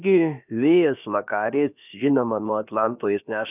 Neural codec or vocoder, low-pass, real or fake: codec, 16 kHz, about 1 kbps, DyCAST, with the encoder's durations; 3.6 kHz; fake